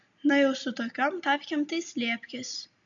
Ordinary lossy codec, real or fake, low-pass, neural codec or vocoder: MP3, 64 kbps; real; 7.2 kHz; none